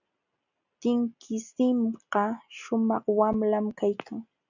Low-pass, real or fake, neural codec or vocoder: 7.2 kHz; real; none